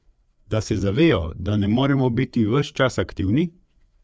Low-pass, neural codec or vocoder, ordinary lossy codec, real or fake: none; codec, 16 kHz, 4 kbps, FreqCodec, larger model; none; fake